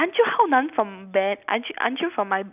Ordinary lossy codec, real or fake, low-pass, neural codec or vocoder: none; real; 3.6 kHz; none